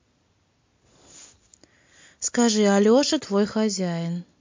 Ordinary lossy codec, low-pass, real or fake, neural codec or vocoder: MP3, 48 kbps; 7.2 kHz; real; none